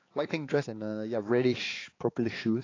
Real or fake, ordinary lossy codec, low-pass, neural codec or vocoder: fake; AAC, 32 kbps; 7.2 kHz; codec, 16 kHz, 4 kbps, X-Codec, HuBERT features, trained on balanced general audio